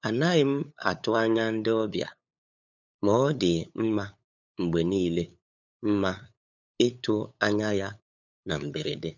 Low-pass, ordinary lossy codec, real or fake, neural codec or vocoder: 7.2 kHz; none; fake; codec, 16 kHz, 8 kbps, FunCodec, trained on LibriTTS, 25 frames a second